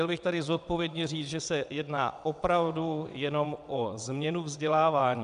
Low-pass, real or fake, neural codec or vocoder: 9.9 kHz; fake; vocoder, 22.05 kHz, 80 mel bands, WaveNeXt